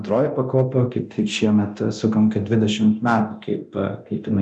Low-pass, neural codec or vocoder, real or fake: 10.8 kHz; codec, 24 kHz, 0.9 kbps, DualCodec; fake